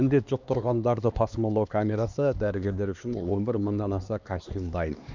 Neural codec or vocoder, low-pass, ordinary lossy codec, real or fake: codec, 16 kHz, 2 kbps, X-Codec, HuBERT features, trained on LibriSpeech; 7.2 kHz; none; fake